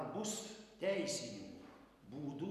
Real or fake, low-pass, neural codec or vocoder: real; 14.4 kHz; none